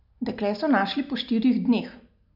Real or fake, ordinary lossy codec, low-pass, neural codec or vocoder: real; none; 5.4 kHz; none